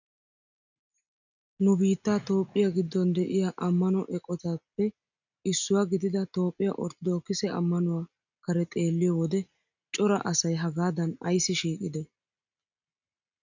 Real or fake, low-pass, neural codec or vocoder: real; 7.2 kHz; none